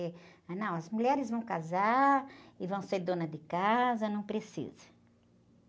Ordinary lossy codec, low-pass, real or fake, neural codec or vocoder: none; none; real; none